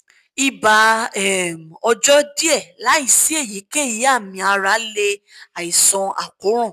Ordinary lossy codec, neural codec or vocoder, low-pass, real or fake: none; none; 14.4 kHz; real